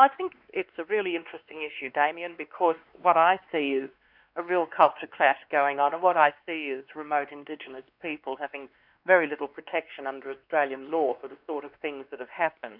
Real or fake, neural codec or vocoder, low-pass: fake; codec, 16 kHz, 2 kbps, X-Codec, WavLM features, trained on Multilingual LibriSpeech; 5.4 kHz